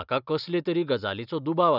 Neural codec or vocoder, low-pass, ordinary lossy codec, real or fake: none; 5.4 kHz; none; real